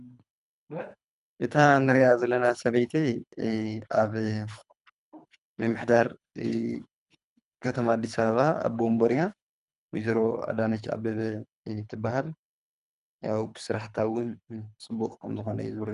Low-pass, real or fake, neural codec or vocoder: 10.8 kHz; fake; codec, 24 kHz, 3 kbps, HILCodec